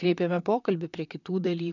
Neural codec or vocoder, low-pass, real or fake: vocoder, 44.1 kHz, 128 mel bands, Pupu-Vocoder; 7.2 kHz; fake